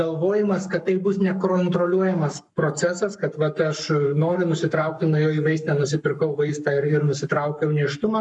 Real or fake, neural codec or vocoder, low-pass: fake; codec, 44.1 kHz, 7.8 kbps, Pupu-Codec; 10.8 kHz